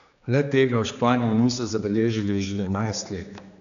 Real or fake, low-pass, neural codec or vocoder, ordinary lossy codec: fake; 7.2 kHz; codec, 16 kHz, 2 kbps, X-Codec, HuBERT features, trained on general audio; none